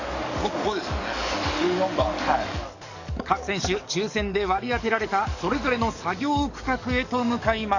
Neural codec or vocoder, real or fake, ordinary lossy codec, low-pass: codec, 44.1 kHz, 7.8 kbps, DAC; fake; none; 7.2 kHz